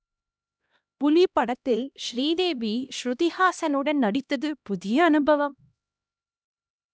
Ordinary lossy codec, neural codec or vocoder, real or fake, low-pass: none; codec, 16 kHz, 1 kbps, X-Codec, HuBERT features, trained on LibriSpeech; fake; none